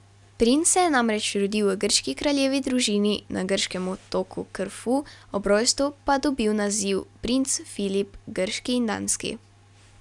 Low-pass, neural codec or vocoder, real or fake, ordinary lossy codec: 10.8 kHz; none; real; none